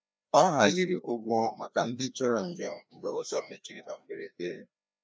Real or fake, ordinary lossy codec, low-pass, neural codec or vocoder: fake; none; none; codec, 16 kHz, 1 kbps, FreqCodec, larger model